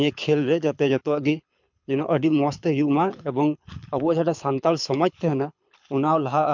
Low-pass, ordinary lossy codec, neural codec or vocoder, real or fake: 7.2 kHz; MP3, 64 kbps; codec, 24 kHz, 6 kbps, HILCodec; fake